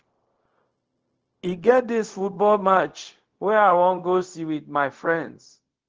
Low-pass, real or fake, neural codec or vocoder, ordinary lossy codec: 7.2 kHz; fake; codec, 16 kHz, 0.4 kbps, LongCat-Audio-Codec; Opus, 16 kbps